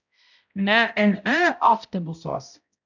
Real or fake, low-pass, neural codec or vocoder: fake; 7.2 kHz; codec, 16 kHz, 0.5 kbps, X-Codec, HuBERT features, trained on balanced general audio